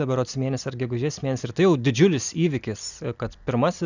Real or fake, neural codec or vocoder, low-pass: real; none; 7.2 kHz